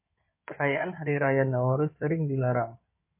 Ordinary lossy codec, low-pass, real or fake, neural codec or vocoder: MP3, 24 kbps; 3.6 kHz; fake; codec, 16 kHz in and 24 kHz out, 2.2 kbps, FireRedTTS-2 codec